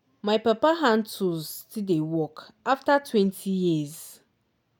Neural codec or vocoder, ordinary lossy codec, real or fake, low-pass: none; none; real; 19.8 kHz